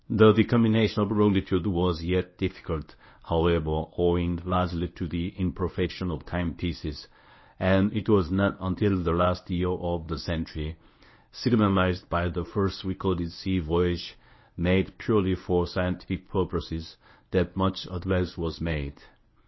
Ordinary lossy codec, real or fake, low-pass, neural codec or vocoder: MP3, 24 kbps; fake; 7.2 kHz; codec, 24 kHz, 0.9 kbps, WavTokenizer, medium speech release version 1